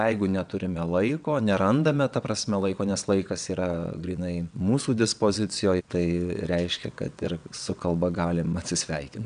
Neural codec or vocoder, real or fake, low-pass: vocoder, 22.05 kHz, 80 mel bands, WaveNeXt; fake; 9.9 kHz